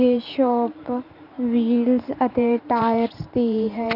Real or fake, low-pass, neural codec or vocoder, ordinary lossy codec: fake; 5.4 kHz; vocoder, 44.1 kHz, 128 mel bands every 512 samples, BigVGAN v2; none